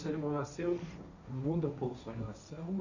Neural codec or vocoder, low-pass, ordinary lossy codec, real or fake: codec, 16 kHz, 1.1 kbps, Voila-Tokenizer; 7.2 kHz; none; fake